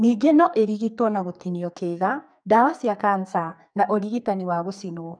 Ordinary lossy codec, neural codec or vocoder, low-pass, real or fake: none; codec, 44.1 kHz, 2.6 kbps, SNAC; 9.9 kHz; fake